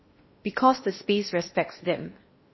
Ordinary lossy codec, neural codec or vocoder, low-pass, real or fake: MP3, 24 kbps; codec, 16 kHz in and 24 kHz out, 0.8 kbps, FocalCodec, streaming, 65536 codes; 7.2 kHz; fake